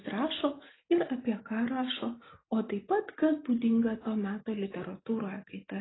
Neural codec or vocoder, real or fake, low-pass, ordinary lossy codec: none; real; 7.2 kHz; AAC, 16 kbps